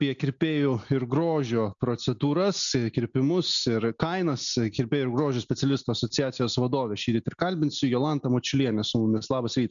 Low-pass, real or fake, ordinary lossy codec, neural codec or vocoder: 7.2 kHz; real; MP3, 96 kbps; none